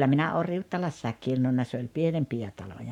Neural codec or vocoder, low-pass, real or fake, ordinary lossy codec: codec, 44.1 kHz, 7.8 kbps, Pupu-Codec; 19.8 kHz; fake; none